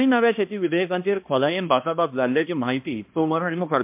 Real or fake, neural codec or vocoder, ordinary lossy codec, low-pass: fake; codec, 16 kHz, 1 kbps, X-Codec, HuBERT features, trained on balanced general audio; MP3, 32 kbps; 3.6 kHz